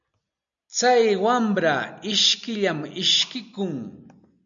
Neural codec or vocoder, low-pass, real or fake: none; 7.2 kHz; real